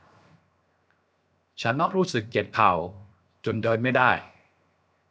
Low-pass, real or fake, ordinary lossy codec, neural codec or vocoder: none; fake; none; codec, 16 kHz, 0.7 kbps, FocalCodec